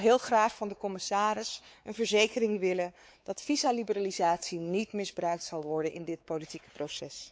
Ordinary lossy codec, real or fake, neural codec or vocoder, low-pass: none; fake; codec, 16 kHz, 4 kbps, X-Codec, WavLM features, trained on Multilingual LibriSpeech; none